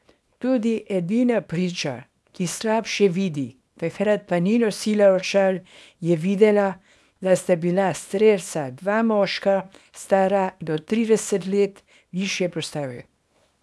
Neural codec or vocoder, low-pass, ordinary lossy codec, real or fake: codec, 24 kHz, 0.9 kbps, WavTokenizer, small release; none; none; fake